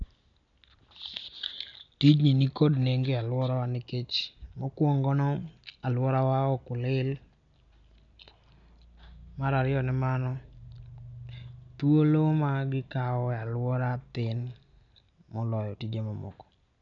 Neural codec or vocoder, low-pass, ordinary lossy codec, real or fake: none; 7.2 kHz; none; real